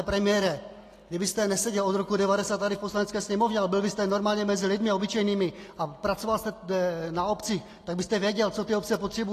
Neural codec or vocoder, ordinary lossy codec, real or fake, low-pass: none; AAC, 48 kbps; real; 14.4 kHz